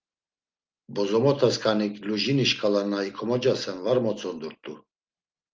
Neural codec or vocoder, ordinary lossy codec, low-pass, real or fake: none; Opus, 32 kbps; 7.2 kHz; real